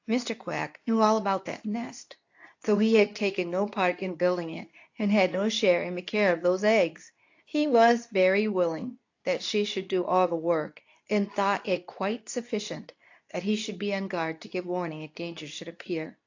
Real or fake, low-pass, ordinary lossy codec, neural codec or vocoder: fake; 7.2 kHz; AAC, 48 kbps; codec, 24 kHz, 0.9 kbps, WavTokenizer, medium speech release version 2